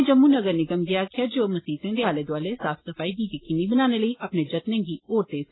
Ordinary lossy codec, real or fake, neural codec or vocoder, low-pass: AAC, 16 kbps; real; none; 7.2 kHz